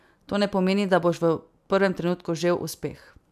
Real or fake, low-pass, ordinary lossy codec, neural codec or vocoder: real; 14.4 kHz; AAC, 96 kbps; none